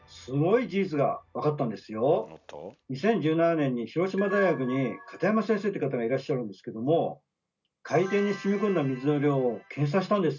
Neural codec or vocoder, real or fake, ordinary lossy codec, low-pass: none; real; none; 7.2 kHz